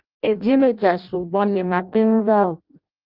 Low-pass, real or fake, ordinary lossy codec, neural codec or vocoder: 5.4 kHz; fake; Opus, 32 kbps; codec, 16 kHz in and 24 kHz out, 0.6 kbps, FireRedTTS-2 codec